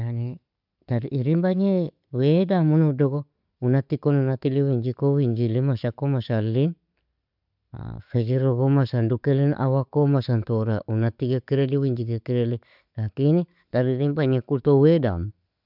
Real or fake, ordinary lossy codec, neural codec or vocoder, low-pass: real; none; none; 5.4 kHz